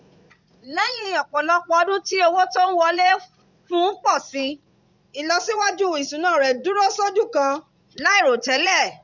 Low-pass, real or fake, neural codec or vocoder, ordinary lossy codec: 7.2 kHz; fake; vocoder, 44.1 kHz, 80 mel bands, Vocos; none